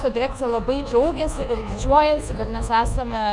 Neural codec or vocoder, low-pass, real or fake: codec, 24 kHz, 1.2 kbps, DualCodec; 10.8 kHz; fake